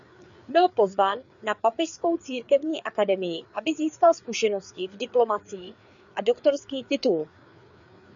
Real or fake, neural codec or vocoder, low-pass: fake; codec, 16 kHz, 4 kbps, FreqCodec, larger model; 7.2 kHz